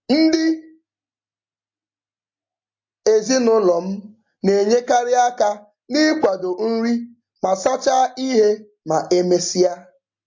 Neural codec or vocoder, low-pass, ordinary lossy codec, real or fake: none; 7.2 kHz; MP3, 48 kbps; real